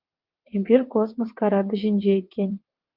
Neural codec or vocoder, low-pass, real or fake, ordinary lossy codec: vocoder, 22.05 kHz, 80 mel bands, WaveNeXt; 5.4 kHz; fake; Opus, 24 kbps